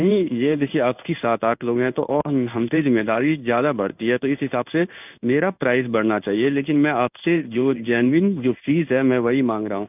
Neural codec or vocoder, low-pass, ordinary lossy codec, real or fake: codec, 16 kHz in and 24 kHz out, 1 kbps, XY-Tokenizer; 3.6 kHz; none; fake